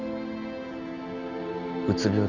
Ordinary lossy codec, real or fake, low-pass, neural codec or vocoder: none; real; 7.2 kHz; none